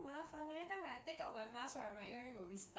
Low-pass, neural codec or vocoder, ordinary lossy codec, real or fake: none; codec, 16 kHz, 2 kbps, FreqCodec, larger model; none; fake